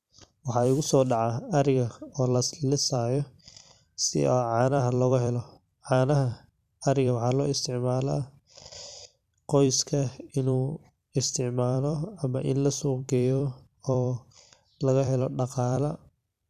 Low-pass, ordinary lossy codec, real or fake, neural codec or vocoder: 14.4 kHz; none; fake; vocoder, 44.1 kHz, 128 mel bands every 256 samples, BigVGAN v2